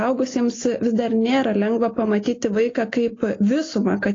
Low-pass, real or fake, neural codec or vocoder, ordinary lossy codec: 7.2 kHz; real; none; AAC, 32 kbps